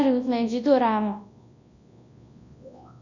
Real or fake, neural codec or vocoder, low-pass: fake; codec, 24 kHz, 0.9 kbps, WavTokenizer, large speech release; 7.2 kHz